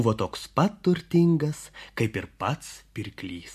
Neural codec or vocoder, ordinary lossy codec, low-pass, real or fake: none; MP3, 64 kbps; 14.4 kHz; real